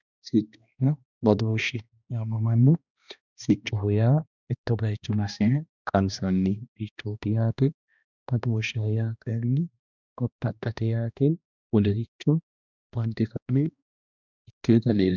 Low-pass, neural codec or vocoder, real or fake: 7.2 kHz; codec, 16 kHz, 1 kbps, X-Codec, HuBERT features, trained on balanced general audio; fake